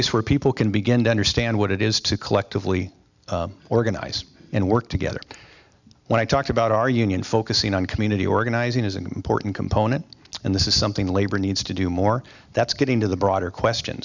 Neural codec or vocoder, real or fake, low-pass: none; real; 7.2 kHz